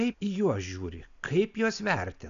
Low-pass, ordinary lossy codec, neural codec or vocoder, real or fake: 7.2 kHz; AAC, 96 kbps; none; real